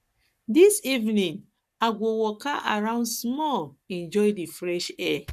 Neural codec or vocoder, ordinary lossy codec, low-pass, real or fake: codec, 44.1 kHz, 7.8 kbps, Pupu-Codec; none; 14.4 kHz; fake